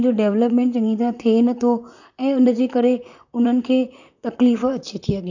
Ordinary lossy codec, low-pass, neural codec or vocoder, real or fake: none; 7.2 kHz; vocoder, 44.1 kHz, 128 mel bands, Pupu-Vocoder; fake